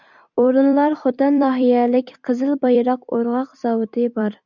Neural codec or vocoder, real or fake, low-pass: vocoder, 44.1 kHz, 128 mel bands every 256 samples, BigVGAN v2; fake; 7.2 kHz